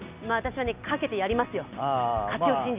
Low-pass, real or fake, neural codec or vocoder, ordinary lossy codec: 3.6 kHz; real; none; Opus, 64 kbps